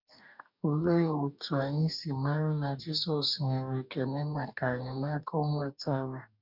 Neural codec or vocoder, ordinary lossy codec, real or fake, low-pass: codec, 44.1 kHz, 2.6 kbps, DAC; none; fake; 5.4 kHz